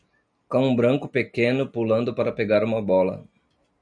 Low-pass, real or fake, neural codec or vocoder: 9.9 kHz; real; none